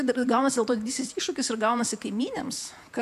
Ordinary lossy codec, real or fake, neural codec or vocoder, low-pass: MP3, 96 kbps; fake; vocoder, 48 kHz, 128 mel bands, Vocos; 14.4 kHz